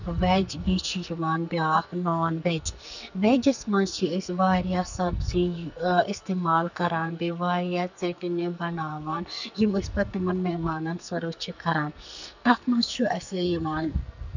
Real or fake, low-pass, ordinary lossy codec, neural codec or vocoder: fake; 7.2 kHz; none; codec, 44.1 kHz, 2.6 kbps, SNAC